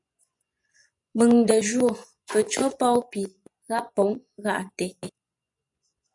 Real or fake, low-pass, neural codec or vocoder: fake; 10.8 kHz; vocoder, 24 kHz, 100 mel bands, Vocos